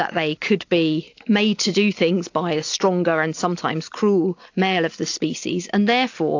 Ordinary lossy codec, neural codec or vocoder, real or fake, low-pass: AAC, 48 kbps; none; real; 7.2 kHz